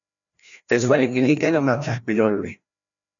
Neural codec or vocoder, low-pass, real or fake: codec, 16 kHz, 1 kbps, FreqCodec, larger model; 7.2 kHz; fake